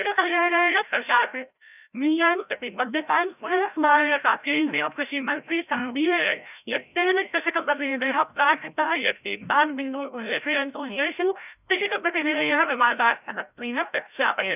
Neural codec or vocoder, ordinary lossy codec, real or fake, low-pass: codec, 16 kHz, 0.5 kbps, FreqCodec, larger model; none; fake; 3.6 kHz